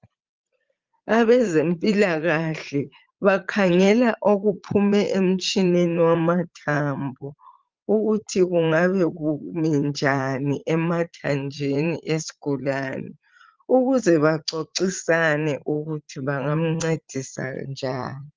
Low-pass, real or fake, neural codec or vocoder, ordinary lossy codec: 7.2 kHz; fake; vocoder, 44.1 kHz, 80 mel bands, Vocos; Opus, 24 kbps